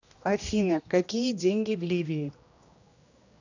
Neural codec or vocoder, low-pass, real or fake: codec, 16 kHz, 1 kbps, X-Codec, HuBERT features, trained on general audio; 7.2 kHz; fake